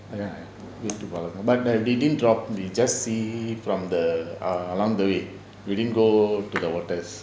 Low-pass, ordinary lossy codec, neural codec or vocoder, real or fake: none; none; none; real